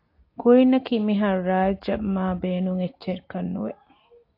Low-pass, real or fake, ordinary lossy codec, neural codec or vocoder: 5.4 kHz; real; AAC, 32 kbps; none